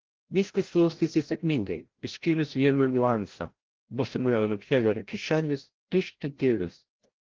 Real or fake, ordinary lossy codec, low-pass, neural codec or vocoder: fake; Opus, 16 kbps; 7.2 kHz; codec, 16 kHz, 0.5 kbps, FreqCodec, larger model